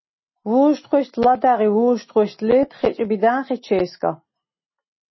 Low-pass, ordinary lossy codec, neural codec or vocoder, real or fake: 7.2 kHz; MP3, 24 kbps; none; real